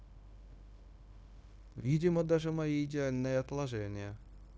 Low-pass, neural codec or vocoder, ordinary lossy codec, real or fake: none; codec, 16 kHz, 0.9 kbps, LongCat-Audio-Codec; none; fake